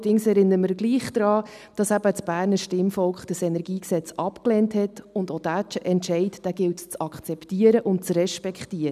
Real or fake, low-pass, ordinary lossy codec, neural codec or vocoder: real; 14.4 kHz; none; none